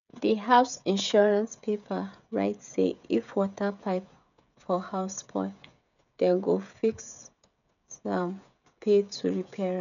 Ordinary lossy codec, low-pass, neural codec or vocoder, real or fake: none; 7.2 kHz; codec, 16 kHz, 16 kbps, FreqCodec, smaller model; fake